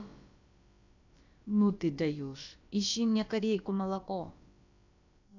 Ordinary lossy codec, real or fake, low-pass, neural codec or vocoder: none; fake; 7.2 kHz; codec, 16 kHz, about 1 kbps, DyCAST, with the encoder's durations